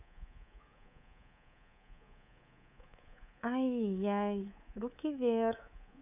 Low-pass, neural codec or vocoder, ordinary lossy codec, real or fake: 3.6 kHz; codec, 24 kHz, 3.1 kbps, DualCodec; none; fake